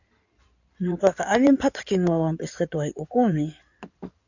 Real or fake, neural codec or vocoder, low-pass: fake; codec, 16 kHz in and 24 kHz out, 2.2 kbps, FireRedTTS-2 codec; 7.2 kHz